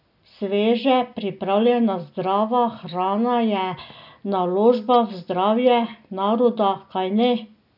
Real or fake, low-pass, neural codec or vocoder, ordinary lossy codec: real; 5.4 kHz; none; none